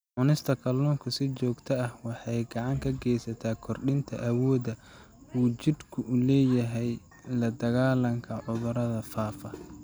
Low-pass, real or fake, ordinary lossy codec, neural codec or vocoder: none; real; none; none